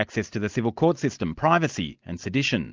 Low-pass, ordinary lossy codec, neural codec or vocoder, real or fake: 7.2 kHz; Opus, 32 kbps; none; real